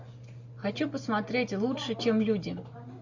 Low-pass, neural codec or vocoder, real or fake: 7.2 kHz; none; real